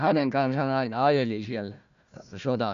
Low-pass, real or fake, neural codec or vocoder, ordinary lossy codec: 7.2 kHz; fake; codec, 16 kHz, 1 kbps, FunCodec, trained on Chinese and English, 50 frames a second; none